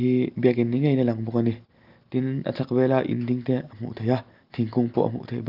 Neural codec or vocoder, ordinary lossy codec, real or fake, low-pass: none; Opus, 32 kbps; real; 5.4 kHz